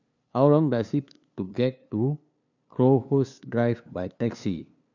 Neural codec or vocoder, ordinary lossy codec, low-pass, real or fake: codec, 16 kHz, 2 kbps, FunCodec, trained on LibriTTS, 25 frames a second; none; 7.2 kHz; fake